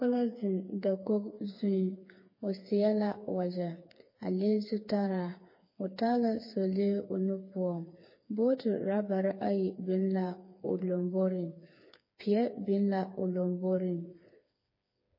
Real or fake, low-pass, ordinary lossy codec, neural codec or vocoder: fake; 5.4 kHz; MP3, 24 kbps; codec, 16 kHz, 4 kbps, FreqCodec, smaller model